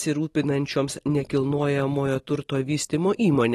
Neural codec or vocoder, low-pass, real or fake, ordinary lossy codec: none; 19.8 kHz; real; AAC, 32 kbps